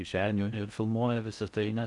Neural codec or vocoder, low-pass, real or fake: codec, 16 kHz in and 24 kHz out, 0.6 kbps, FocalCodec, streaming, 4096 codes; 10.8 kHz; fake